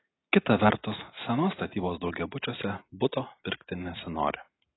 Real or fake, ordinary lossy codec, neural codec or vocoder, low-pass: real; AAC, 16 kbps; none; 7.2 kHz